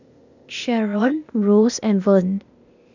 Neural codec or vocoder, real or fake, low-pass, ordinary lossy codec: codec, 16 kHz, 0.8 kbps, ZipCodec; fake; 7.2 kHz; none